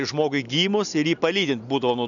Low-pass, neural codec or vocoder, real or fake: 7.2 kHz; none; real